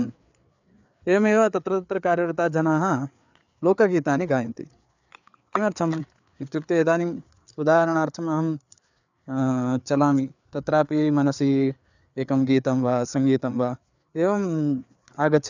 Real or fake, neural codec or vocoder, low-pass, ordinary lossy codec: fake; codec, 16 kHz, 4 kbps, FreqCodec, larger model; 7.2 kHz; none